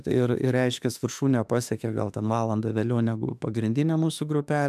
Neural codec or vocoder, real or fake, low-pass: autoencoder, 48 kHz, 32 numbers a frame, DAC-VAE, trained on Japanese speech; fake; 14.4 kHz